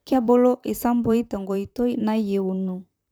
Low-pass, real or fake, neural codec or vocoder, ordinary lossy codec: none; fake; vocoder, 44.1 kHz, 128 mel bands, Pupu-Vocoder; none